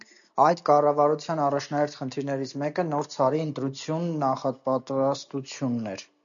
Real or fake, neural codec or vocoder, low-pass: real; none; 7.2 kHz